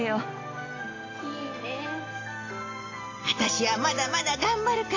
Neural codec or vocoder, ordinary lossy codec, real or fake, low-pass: none; AAC, 32 kbps; real; 7.2 kHz